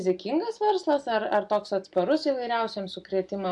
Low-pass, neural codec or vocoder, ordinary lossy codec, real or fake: 10.8 kHz; none; AAC, 64 kbps; real